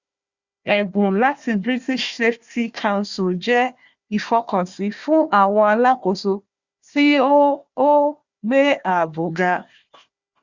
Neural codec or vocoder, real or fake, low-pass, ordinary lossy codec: codec, 16 kHz, 1 kbps, FunCodec, trained on Chinese and English, 50 frames a second; fake; 7.2 kHz; Opus, 64 kbps